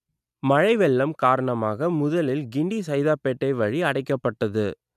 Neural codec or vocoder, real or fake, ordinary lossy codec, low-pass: none; real; none; 14.4 kHz